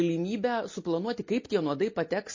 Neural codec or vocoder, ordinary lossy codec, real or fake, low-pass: none; MP3, 32 kbps; real; 7.2 kHz